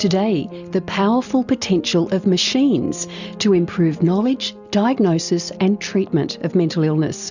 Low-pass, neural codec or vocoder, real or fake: 7.2 kHz; none; real